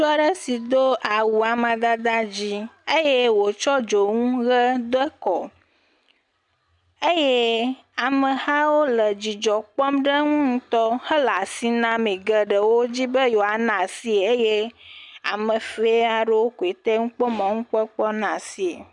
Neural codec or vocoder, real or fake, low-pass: none; real; 10.8 kHz